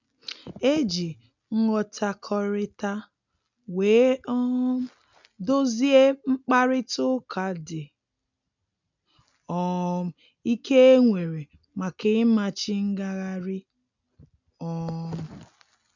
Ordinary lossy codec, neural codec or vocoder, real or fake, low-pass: none; none; real; 7.2 kHz